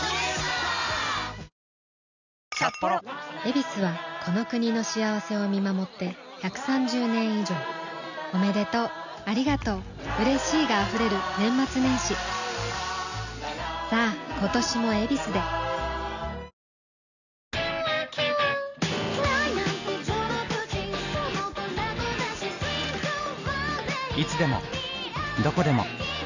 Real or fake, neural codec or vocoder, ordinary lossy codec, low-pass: real; none; none; 7.2 kHz